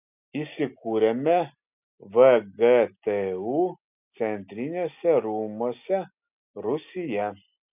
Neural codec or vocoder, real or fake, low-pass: none; real; 3.6 kHz